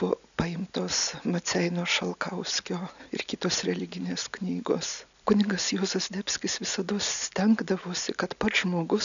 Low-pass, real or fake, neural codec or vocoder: 7.2 kHz; real; none